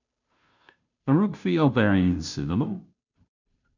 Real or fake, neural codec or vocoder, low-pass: fake; codec, 16 kHz, 0.5 kbps, FunCodec, trained on Chinese and English, 25 frames a second; 7.2 kHz